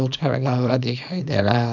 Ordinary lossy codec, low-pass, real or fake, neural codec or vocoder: none; 7.2 kHz; fake; codec, 24 kHz, 0.9 kbps, WavTokenizer, small release